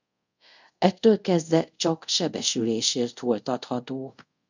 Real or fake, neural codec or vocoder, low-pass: fake; codec, 24 kHz, 0.5 kbps, DualCodec; 7.2 kHz